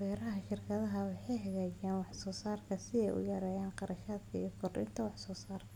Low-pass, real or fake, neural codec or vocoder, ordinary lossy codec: 19.8 kHz; real; none; none